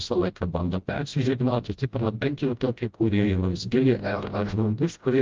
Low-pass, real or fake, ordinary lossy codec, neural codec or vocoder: 7.2 kHz; fake; Opus, 24 kbps; codec, 16 kHz, 0.5 kbps, FreqCodec, smaller model